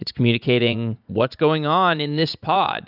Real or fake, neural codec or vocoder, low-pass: fake; vocoder, 44.1 kHz, 80 mel bands, Vocos; 5.4 kHz